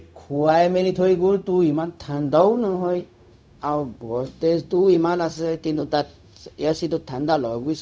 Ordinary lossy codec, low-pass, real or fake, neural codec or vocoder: none; none; fake; codec, 16 kHz, 0.4 kbps, LongCat-Audio-Codec